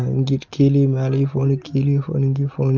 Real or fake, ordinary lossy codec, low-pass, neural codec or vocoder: real; Opus, 32 kbps; 7.2 kHz; none